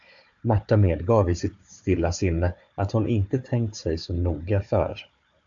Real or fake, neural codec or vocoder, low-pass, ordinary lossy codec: fake; codec, 16 kHz, 4 kbps, FunCodec, trained on Chinese and English, 50 frames a second; 7.2 kHz; AAC, 64 kbps